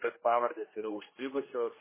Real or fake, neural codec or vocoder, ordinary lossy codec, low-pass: fake; codec, 16 kHz, 1 kbps, X-Codec, HuBERT features, trained on general audio; MP3, 16 kbps; 3.6 kHz